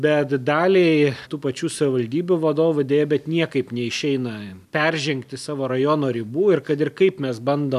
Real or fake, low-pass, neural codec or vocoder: real; 14.4 kHz; none